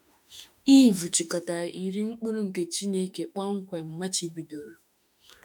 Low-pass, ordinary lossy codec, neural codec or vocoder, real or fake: none; none; autoencoder, 48 kHz, 32 numbers a frame, DAC-VAE, trained on Japanese speech; fake